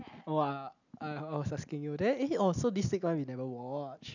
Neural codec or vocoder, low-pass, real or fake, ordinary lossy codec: none; 7.2 kHz; real; none